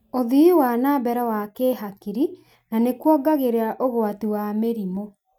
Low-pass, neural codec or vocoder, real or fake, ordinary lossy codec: 19.8 kHz; none; real; none